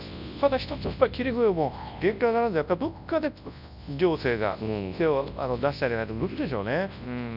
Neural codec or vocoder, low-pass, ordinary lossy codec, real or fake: codec, 24 kHz, 0.9 kbps, WavTokenizer, large speech release; 5.4 kHz; none; fake